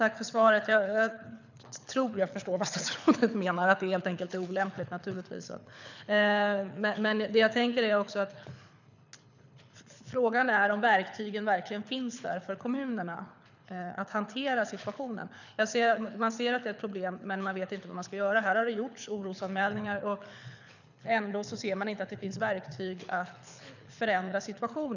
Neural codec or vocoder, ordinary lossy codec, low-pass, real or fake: codec, 24 kHz, 6 kbps, HILCodec; none; 7.2 kHz; fake